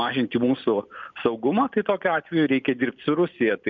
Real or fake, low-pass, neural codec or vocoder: real; 7.2 kHz; none